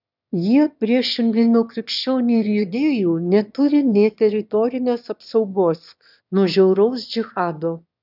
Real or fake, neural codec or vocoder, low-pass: fake; autoencoder, 22.05 kHz, a latent of 192 numbers a frame, VITS, trained on one speaker; 5.4 kHz